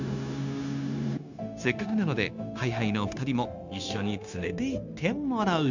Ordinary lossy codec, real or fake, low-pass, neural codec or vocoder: none; fake; 7.2 kHz; codec, 16 kHz, 0.9 kbps, LongCat-Audio-Codec